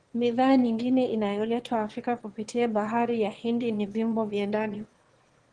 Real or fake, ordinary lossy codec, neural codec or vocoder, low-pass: fake; Opus, 16 kbps; autoencoder, 22.05 kHz, a latent of 192 numbers a frame, VITS, trained on one speaker; 9.9 kHz